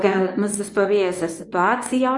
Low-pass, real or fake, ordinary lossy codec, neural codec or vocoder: 10.8 kHz; fake; Opus, 64 kbps; codec, 24 kHz, 0.9 kbps, WavTokenizer, medium speech release version 1